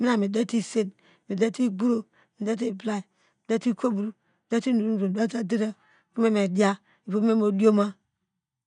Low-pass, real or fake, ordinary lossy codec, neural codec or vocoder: 9.9 kHz; real; none; none